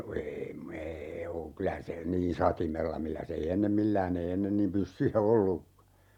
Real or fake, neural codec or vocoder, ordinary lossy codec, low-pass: fake; vocoder, 48 kHz, 128 mel bands, Vocos; none; 19.8 kHz